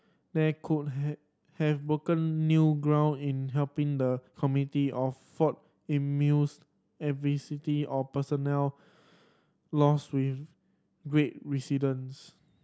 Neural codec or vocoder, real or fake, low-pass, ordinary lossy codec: none; real; none; none